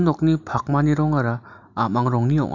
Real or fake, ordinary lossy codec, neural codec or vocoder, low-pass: real; none; none; 7.2 kHz